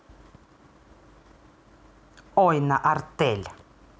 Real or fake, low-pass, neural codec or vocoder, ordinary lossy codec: real; none; none; none